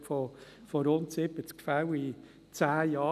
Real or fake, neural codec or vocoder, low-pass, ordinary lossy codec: real; none; 14.4 kHz; none